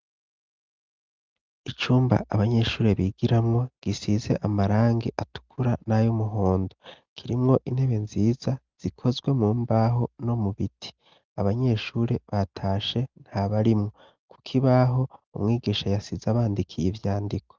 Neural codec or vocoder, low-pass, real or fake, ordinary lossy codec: none; 7.2 kHz; real; Opus, 32 kbps